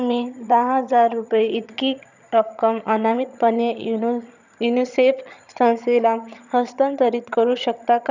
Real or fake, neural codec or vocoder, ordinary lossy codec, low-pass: fake; vocoder, 22.05 kHz, 80 mel bands, HiFi-GAN; none; 7.2 kHz